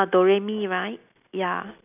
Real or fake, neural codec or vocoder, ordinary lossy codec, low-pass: real; none; none; 3.6 kHz